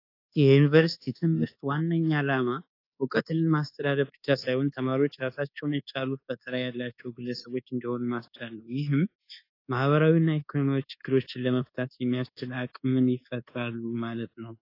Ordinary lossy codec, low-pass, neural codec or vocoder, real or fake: AAC, 32 kbps; 5.4 kHz; codec, 24 kHz, 1.2 kbps, DualCodec; fake